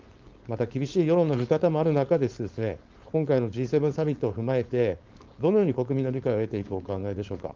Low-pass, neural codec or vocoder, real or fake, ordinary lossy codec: 7.2 kHz; codec, 16 kHz, 4.8 kbps, FACodec; fake; Opus, 32 kbps